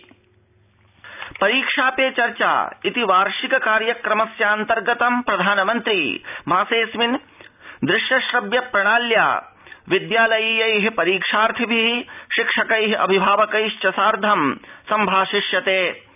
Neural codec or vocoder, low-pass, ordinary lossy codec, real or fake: none; 3.6 kHz; none; real